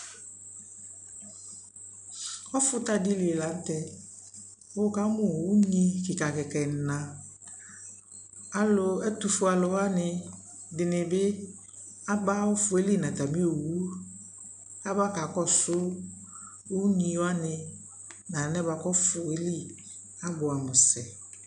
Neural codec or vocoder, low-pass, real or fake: none; 9.9 kHz; real